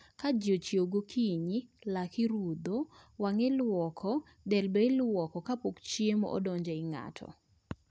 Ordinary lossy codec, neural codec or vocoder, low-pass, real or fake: none; none; none; real